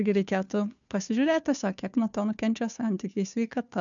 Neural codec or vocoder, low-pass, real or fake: codec, 16 kHz, 2 kbps, FunCodec, trained on Chinese and English, 25 frames a second; 7.2 kHz; fake